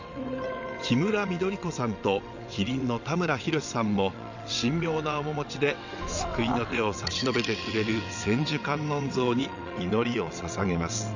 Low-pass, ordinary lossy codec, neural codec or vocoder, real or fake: 7.2 kHz; none; vocoder, 22.05 kHz, 80 mel bands, WaveNeXt; fake